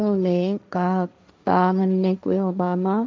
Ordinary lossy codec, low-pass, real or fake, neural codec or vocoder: none; none; fake; codec, 16 kHz, 1.1 kbps, Voila-Tokenizer